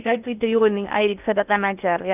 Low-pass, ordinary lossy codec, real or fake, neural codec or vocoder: 3.6 kHz; none; fake; codec, 16 kHz in and 24 kHz out, 0.6 kbps, FocalCodec, streaming, 2048 codes